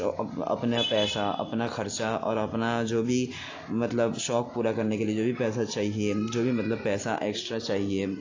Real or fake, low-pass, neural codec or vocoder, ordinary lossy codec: real; 7.2 kHz; none; AAC, 32 kbps